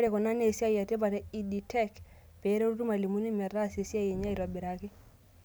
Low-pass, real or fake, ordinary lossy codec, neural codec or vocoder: none; real; none; none